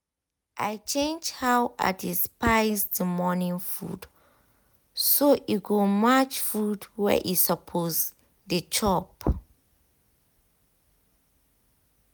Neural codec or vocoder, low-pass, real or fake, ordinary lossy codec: none; none; real; none